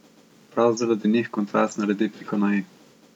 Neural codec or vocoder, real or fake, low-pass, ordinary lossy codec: none; real; 19.8 kHz; none